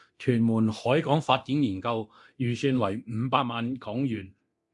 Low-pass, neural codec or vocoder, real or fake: 10.8 kHz; codec, 24 kHz, 0.9 kbps, DualCodec; fake